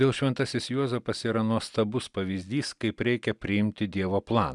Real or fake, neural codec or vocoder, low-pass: real; none; 10.8 kHz